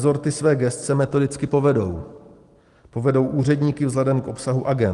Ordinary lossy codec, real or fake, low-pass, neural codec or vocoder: Opus, 24 kbps; real; 10.8 kHz; none